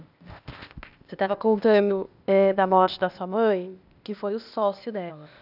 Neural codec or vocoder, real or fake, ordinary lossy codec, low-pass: codec, 16 kHz, 0.8 kbps, ZipCodec; fake; none; 5.4 kHz